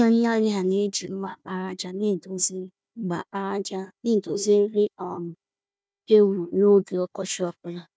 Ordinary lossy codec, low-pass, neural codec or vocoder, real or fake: none; none; codec, 16 kHz, 1 kbps, FunCodec, trained on Chinese and English, 50 frames a second; fake